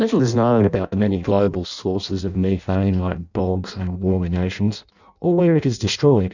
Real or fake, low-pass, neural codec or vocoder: fake; 7.2 kHz; codec, 16 kHz in and 24 kHz out, 0.6 kbps, FireRedTTS-2 codec